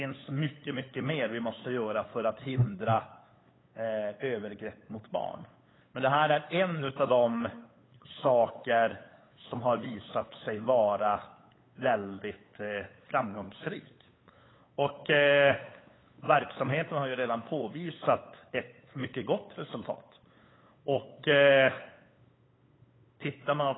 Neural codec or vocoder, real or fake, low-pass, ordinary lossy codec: codec, 16 kHz, 8 kbps, FunCodec, trained on LibriTTS, 25 frames a second; fake; 7.2 kHz; AAC, 16 kbps